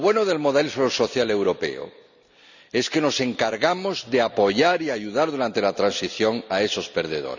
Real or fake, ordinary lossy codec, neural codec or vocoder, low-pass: real; none; none; 7.2 kHz